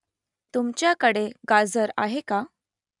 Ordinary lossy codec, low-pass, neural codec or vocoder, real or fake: none; 10.8 kHz; none; real